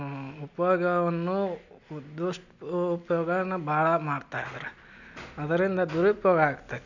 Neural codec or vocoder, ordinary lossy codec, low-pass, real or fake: none; none; 7.2 kHz; real